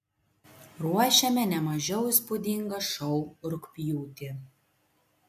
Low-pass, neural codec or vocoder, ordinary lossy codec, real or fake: 14.4 kHz; none; MP3, 64 kbps; real